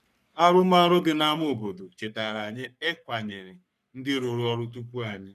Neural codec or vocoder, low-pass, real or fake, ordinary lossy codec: codec, 44.1 kHz, 3.4 kbps, Pupu-Codec; 14.4 kHz; fake; none